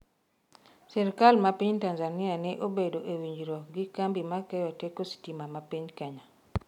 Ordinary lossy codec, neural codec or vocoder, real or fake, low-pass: none; none; real; 19.8 kHz